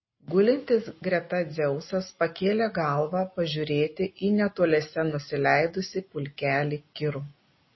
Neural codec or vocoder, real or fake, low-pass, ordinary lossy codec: none; real; 7.2 kHz; MP3, 24 kbps